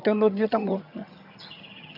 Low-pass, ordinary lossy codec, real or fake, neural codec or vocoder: 5.4 kHz; none; fake; vocoder, 22.05 kHz, 80 mel bands, HiFi-GAN